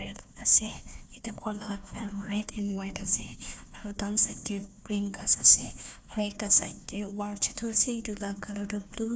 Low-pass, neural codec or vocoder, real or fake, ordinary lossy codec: none; codec, 16 kHz, 1 kbps, FunCodec, trained on Chinese and English, 50 frames a second; fake; none